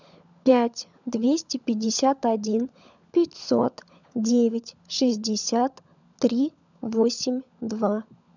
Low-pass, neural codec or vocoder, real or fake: 7.2 kHz; codec, 16 kHz, 16 kbps, FunCodec, trained on LibriTTS, 50 frames a second; fake